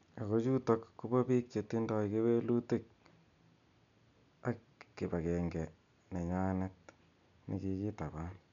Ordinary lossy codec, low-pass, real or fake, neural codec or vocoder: none; 7.2 kHz; real; none